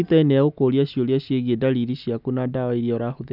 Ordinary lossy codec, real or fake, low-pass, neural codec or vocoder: none; real; 5.4 kHz; none